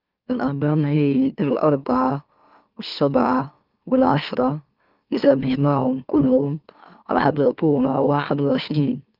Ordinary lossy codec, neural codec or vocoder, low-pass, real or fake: Opus, 24 kbps; autoencoder, 44.1 kHz, a latent of 192 numbers a frame, MeloTTS; 5.4 kHz; fake